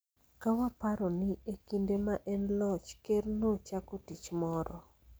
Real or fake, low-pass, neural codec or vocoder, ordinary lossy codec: real; none; none; none